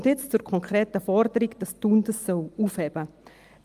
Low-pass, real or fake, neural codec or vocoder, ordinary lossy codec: 14.4 kHz; real; none; Opus, 32 kbps